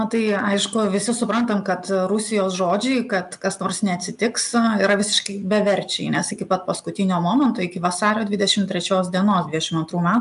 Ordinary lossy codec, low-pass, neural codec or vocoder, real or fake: AAC, 96 kbps; 10.8 kHz; none; real